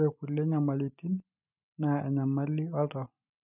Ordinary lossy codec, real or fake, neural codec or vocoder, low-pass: none; real; none; 3.6 kHz